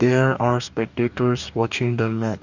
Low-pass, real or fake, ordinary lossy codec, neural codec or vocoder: 7.2 kHz; fake; none; codec, 44.1 kHz, 2.6 kbps, DAC